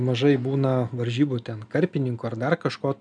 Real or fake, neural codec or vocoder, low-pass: real; none; 9.9 kHz